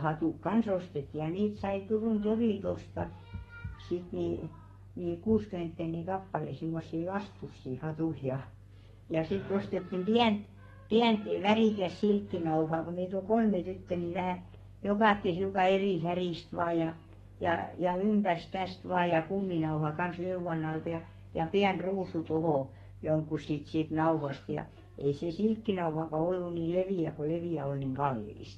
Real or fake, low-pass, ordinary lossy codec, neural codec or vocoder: fake; 14.4 kHz; AAC, 32 kbps; codec, 32 kHz, 1.9 kbps, SNAC